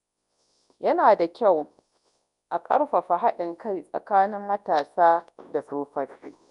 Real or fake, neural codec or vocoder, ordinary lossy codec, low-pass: fake; codec, 24 kHz, 0.9 kbps, WavTokenizer, large speech release; none; 10.8 kHz